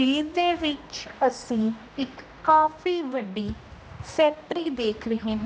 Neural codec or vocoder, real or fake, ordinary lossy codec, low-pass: codec, 16 kHz, 1 kbps, X-Codec, HuBERT features, trained on general audio; fake; none; none